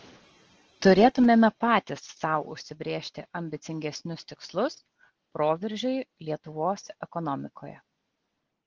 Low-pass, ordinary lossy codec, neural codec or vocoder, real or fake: 7.2 kHz; Opus, 16 kbps; none; real